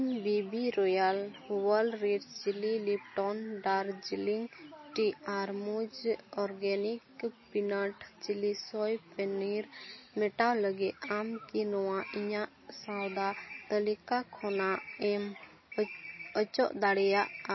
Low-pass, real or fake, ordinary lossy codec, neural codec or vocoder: 7.2 kHz; real; MP3, 24 kbps; none